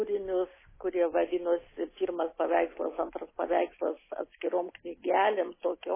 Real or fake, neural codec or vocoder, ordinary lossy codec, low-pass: real; none; MP3, 16 kbps; 3.6 kHz